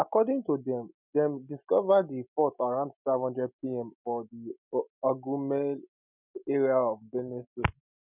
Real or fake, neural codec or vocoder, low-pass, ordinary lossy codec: real; none; 3.6 kHz; none